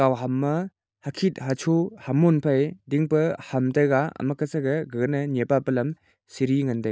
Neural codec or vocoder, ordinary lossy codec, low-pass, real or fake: none; none; none; real